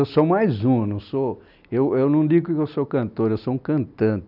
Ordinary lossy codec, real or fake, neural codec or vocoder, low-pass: none; real; none; 5.4 kHz